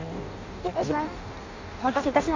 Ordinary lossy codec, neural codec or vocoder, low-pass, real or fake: AAC, 48 kbps; codec, 16 kHz in and 24 kHz out, 0.6 kbps, FireRedTTS-2 codec; 7.2 kHz; fake